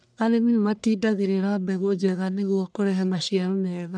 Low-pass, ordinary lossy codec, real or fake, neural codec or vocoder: 9.9 kHz; none; fake; codec, 44.1 kHz, 1.7 kbps, Pupu-Codec